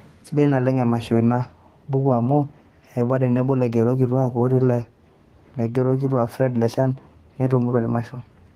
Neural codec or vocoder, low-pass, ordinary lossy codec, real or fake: codec, 32 kHz, 1.9 kbps, SNAC; 14.4 kHz; Opus, 24 kbps; fake